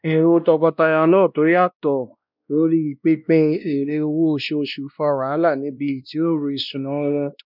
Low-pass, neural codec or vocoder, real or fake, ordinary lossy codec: 5.4 kHz; codec, 16 kHz, 1 kbps, X-Codec, WavLM features, trained on Multilingual LibriSpeech; fake; none